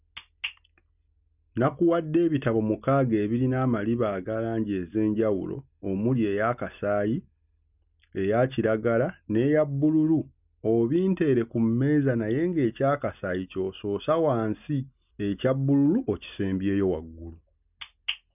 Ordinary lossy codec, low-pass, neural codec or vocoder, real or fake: none; 3.6 kHz; none; real